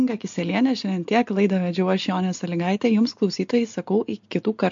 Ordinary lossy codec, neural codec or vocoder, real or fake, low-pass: MP3, 48 kbps; none; real; 7.2 kHz